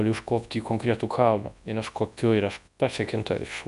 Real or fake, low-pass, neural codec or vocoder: fake; 10.8 kHz; codec, 24 kHz, 0.9 kbps, WavTokenizer, large speech release